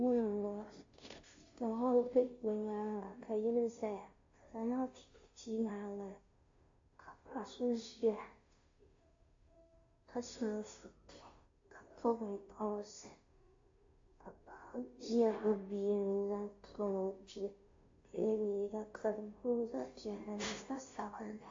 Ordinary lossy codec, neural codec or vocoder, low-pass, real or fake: AAC, 48 kbps; codec, 16 kHz, 0.5 kbps, FunCodec, trained on Chinese and English, 25 frames a second; 7.2 kHz; fake